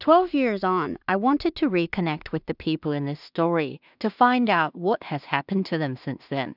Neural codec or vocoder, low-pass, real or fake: codec, 16 kHz in and 24 kHz out, 0.4 kbps, LongCat-Audio-Codec, two codebook decoder; 5.4 kHz; fake